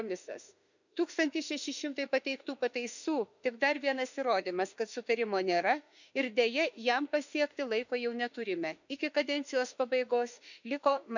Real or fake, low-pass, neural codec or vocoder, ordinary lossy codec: fake; 7.2 kHz; autoencoder, 48 kHz, 32 numbers a frame, DAC-VAE, trained on Japanese speech; none